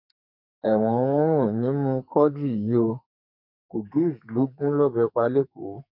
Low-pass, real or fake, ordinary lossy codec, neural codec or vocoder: 5.4 kHz; fake; none; codec, 44.1 kHz, 2.6 kbps, SNAC